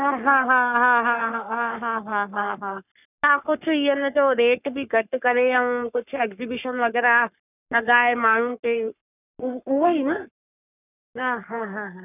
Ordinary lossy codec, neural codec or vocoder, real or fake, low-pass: none; codec, 44.1 kHz, 3.4 kbps, Pupu-Codec; fake; 3.6 kHz